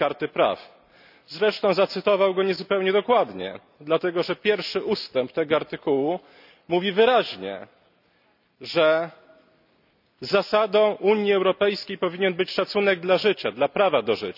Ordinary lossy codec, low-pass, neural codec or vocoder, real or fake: none; 5.4 kHz; none; real